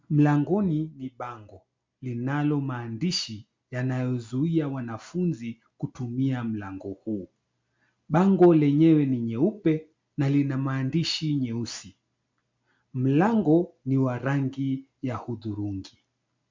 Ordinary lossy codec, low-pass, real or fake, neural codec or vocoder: MP3, 64 kbps; 7.2 kHz; real; none